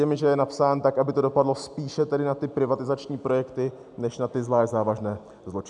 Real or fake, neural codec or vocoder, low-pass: real; none; 10.8 kHz